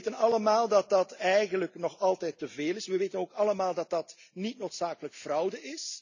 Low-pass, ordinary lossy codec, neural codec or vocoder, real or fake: 7.2 kHz; none; none; real